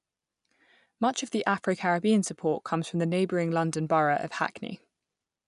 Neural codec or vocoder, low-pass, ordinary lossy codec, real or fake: none; 10.8 kHz; none; real